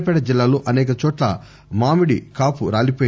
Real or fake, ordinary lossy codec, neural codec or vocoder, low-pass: real; none; none; none